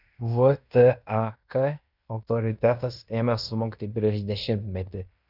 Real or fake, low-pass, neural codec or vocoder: fake; 5.4 kHz; codec, 16 kHz in and 24 kHz out, 0.9 kbps, LongCat-Audio-Codec, fine tuned four codebook decoder